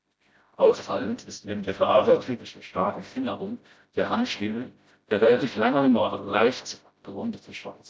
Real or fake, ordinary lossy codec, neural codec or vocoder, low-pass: fake; none; codec, 16 kHz, 0.5 kbps, FreqCodec, smaller model; none